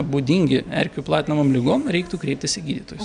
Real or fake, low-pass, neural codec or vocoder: real; 9.9 kHz; none